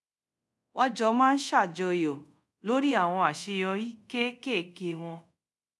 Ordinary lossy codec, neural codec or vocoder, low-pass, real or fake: none; codec, 24 kHz, 0.5 kbps, DualCodec; none; fake